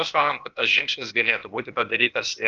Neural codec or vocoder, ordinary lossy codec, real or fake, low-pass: codec, 16 kHz, 0.8 kbps, ZipCodec; Opus, 24 kbps; fake; 7.2 kHz